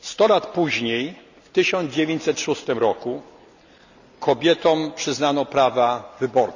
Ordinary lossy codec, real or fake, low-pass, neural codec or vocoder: none; real; 7.2 kHz; none